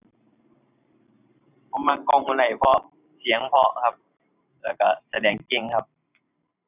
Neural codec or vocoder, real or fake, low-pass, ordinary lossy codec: vocoder, 44.1 kHz, 128 mel bands every 512 samples, BigVGAN v2; fake; 3.6 kHz; none